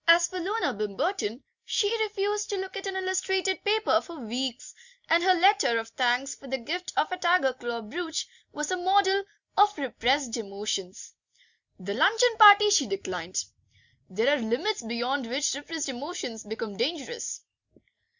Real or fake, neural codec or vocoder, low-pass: real; none; 7.2 kHz